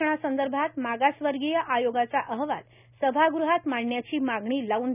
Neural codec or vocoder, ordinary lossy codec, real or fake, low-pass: none; none; real; 3.6 kHz